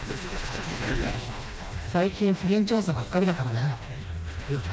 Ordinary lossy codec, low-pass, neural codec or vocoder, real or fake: none; none; codec, 16 kHz, 1 kbps, FreqCodec, smaller model; fake